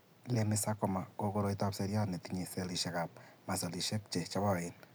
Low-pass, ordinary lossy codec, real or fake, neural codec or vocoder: none; none; real; none